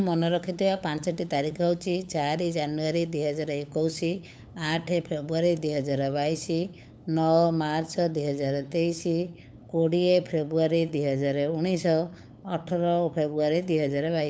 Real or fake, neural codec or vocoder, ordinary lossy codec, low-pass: fake; codec, 16 kHz, 8 kbps, FunCodec, trained on LibriTTS, 25 frames a second; none; none